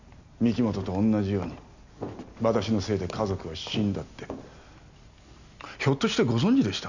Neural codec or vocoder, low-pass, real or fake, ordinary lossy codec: none; 7.2 kHz; real; none